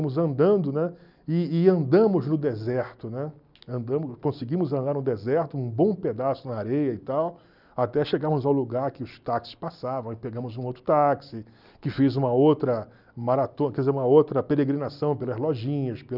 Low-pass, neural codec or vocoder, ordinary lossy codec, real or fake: 5.4 kHz; none; none; real